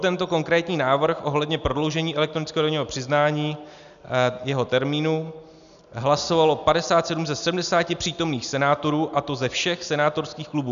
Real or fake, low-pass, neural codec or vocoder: real; 7.2 kHz; none